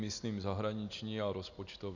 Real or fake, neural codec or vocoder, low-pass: real; none; 7.2 kHz